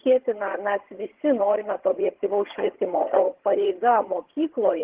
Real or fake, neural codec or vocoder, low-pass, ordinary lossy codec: fake; vocoder, 22.05 kHz, 80 mel bands, HiFi-GAN; 3.6 kHz; Opus, 16 kbps